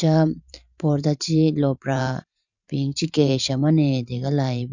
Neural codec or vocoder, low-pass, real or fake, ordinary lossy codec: vocoder, 44.1 kHz, 128 mel bands every 512 samples, BigVGAN v2; 7.2 kHz; fake; none